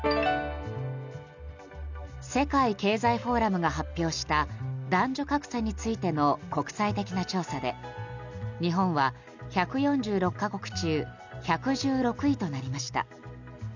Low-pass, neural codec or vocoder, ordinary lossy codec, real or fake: 7.2 kHz; none; none; real